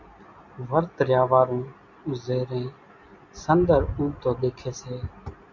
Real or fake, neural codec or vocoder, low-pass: real; none; 7.2 kHz